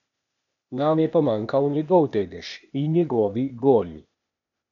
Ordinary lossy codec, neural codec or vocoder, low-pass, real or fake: none; codec, 16 kHz, 0.8 kbps, ZipCodec; 7.2 kHz; fake